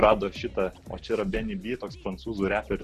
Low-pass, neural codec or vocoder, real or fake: 14.4 kHz; none; real